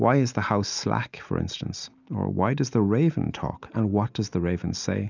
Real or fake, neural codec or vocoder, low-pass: real; none; 7.2 kHz